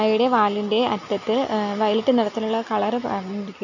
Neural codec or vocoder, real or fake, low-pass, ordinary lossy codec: none; real; 7.2 kHz; none